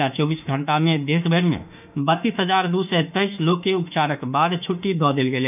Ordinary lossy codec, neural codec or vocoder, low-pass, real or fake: none; autoencoder, 48 kHz, 32 numbers a frame, DAC-VAE, trained on Japanese speech; 3.6 kHz; fake